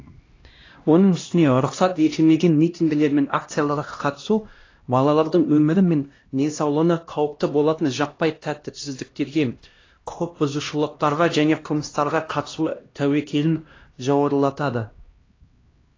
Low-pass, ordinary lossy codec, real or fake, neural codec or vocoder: 7.2 kHz; AAC, 32 kbps; fake; codec, 16 kHz, 1 kbps, X-Codec, HuBERT features, trained on LibriSpeech